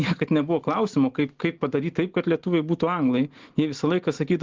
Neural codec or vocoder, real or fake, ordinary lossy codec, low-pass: none; real; Opus, 16 kbps; 7.2 kHz